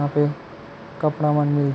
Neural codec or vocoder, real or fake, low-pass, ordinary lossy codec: none; real; none; none